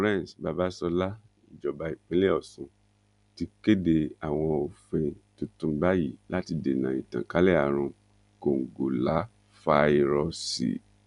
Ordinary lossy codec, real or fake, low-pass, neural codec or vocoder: none; real; 10.8 kHz; none